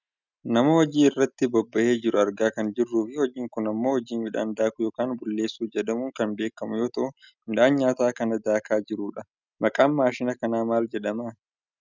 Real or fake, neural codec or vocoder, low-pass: real; none; 7.2 kHz